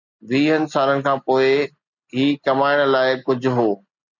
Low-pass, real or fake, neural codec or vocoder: 7.2 kHz; real; none